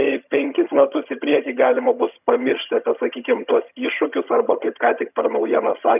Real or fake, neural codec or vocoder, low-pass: fake; vocoder, 22.05 kHz, 80 mel bands, HiFi-GAN; 3.6 kHz